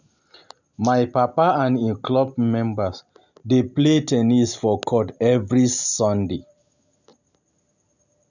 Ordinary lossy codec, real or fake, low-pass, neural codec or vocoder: none; real; 7.2 kHz; none